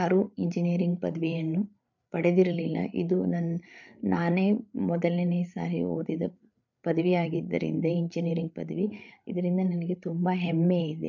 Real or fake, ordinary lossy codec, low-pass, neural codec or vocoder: fake; none; 7.2 kHz; codec, 16 kHz, 8 kbps, FreqCodec, larger model